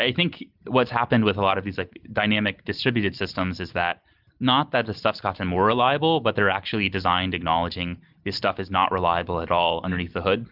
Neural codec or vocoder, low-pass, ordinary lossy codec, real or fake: none; 5.4 kHz; Opus, 32 kbps; real